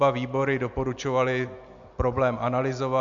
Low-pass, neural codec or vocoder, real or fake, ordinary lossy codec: 7.2 kHz; none; real; MP3, 64 kbps